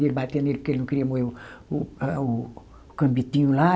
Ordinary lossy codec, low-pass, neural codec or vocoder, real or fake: none; none; none; real